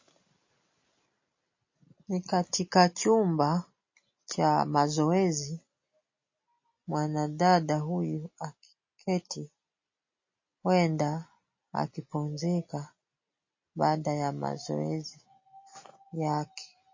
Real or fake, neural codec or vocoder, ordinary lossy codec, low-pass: real; none; MP3, 32 kbps; 7.2 kHz